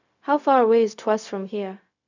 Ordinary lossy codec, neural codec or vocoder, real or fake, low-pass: none; codec, 16 kHz, 0.4 kbps, LongCat-Audio-Codec; fake; 7.2 kHz